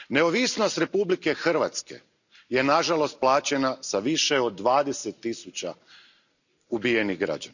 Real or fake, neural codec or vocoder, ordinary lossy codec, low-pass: real; none; none; 7.2 kHz